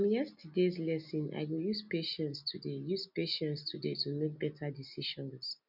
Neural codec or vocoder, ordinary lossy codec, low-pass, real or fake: none; none; 5.4 kHz; real